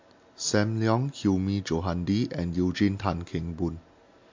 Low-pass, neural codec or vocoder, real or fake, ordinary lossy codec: 7.2 kHz; none; real; MP3, 48 kbps